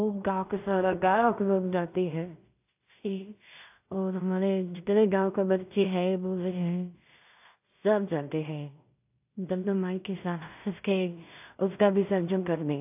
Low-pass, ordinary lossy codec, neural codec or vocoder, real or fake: 3.6 kHz; AAC, 32 kbps; codec, 16 kHz in and 24 kHz out, 0.4 kbps, LongCat-Audio-Codec, two codebook decoder; fake